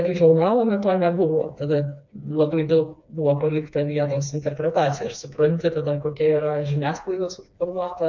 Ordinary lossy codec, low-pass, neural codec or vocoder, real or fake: MP3, 48 kbps; 7.2 kHz; codec, 16 kHz, 2 kbps, FreqCodec, smaller model; fake